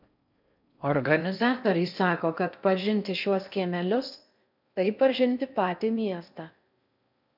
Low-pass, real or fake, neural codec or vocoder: 5.4 kHz; fake; codec, 16 kHz in and 24 kHz out, 0.6 kbps, FocalCodec, streaming, 4096 codes